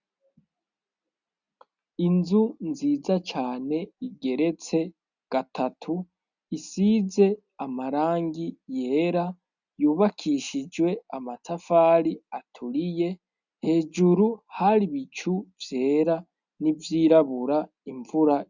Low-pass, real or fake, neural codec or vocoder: 7.2 kHz; real; none